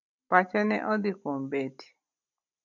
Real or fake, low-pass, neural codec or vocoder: real; 7.2 kHz; none